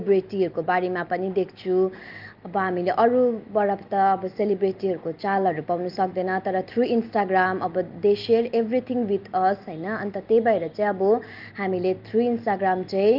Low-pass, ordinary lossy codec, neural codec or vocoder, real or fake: 5.4 kHz; Opus, 24 kbps; none; real